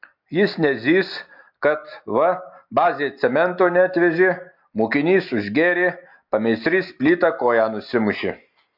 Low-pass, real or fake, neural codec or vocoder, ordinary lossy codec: 5.4 kHz; real; none; MP3, 48 kbps